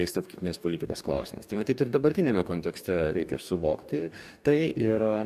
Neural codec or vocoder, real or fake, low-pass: codec, 44.1 kHz, 2.6 kbps, DAC; fake; 14.4 kHz